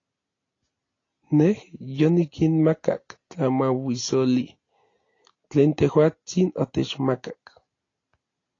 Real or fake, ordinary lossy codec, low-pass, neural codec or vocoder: real; AAC, 32 kbps; 7.2 kHz; none